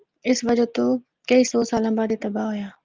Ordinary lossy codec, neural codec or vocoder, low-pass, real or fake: Opus, 32 kbps; none; 7.2 kHz; real